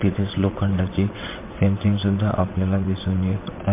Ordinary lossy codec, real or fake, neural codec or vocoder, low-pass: MP3, 24 kbps; fake; vocoder, 22.05 kHz, 80 mel bands, WaveNeXt; 3.6 kHz